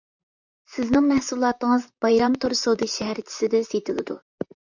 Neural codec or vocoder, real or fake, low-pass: vocoder, 44.1 kHz, 128 mel bands, Pupu-Vocoder; fake; 7.2 kHz